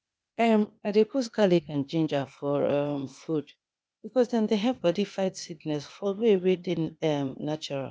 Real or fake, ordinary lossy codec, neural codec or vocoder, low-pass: fake; none; codec, 16 kHz, 0.8 kbps, ZipCodec; none